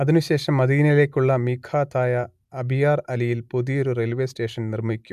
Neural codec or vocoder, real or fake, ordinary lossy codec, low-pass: none; real; MP3, 96 kbps; 14.4 kHz